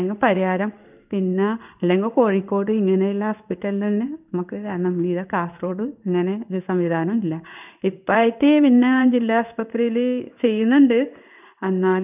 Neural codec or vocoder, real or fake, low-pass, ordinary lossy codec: codec, 16 kHz in and 24 kHz out, 1 kbps, XY-Tokenizer; fake; 3.6 kHz; none